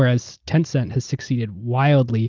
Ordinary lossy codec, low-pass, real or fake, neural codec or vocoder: Opus, 24 kbps; 7.2 kHz; real; none